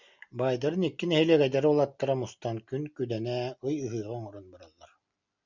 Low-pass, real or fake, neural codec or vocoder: 7.2 kHz; real; none